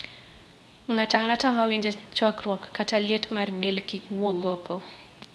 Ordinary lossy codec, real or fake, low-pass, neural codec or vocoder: none; fake; none; codec, 24 kHz, 0.9 kbps, WavTokenizer, medium speech release version 2